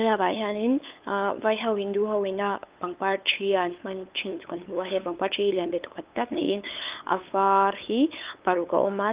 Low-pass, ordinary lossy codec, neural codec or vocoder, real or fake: 3.6 kHz; Opus, 24 kbps; codec, 16 kHz, 8 kbps, FunCodec, trained on LibriTTS, 25 frames a second; fake